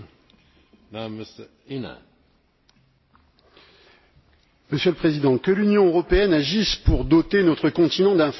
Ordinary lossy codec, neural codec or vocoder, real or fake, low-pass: MP3, 24 kbps; none; real; 7.2 kHz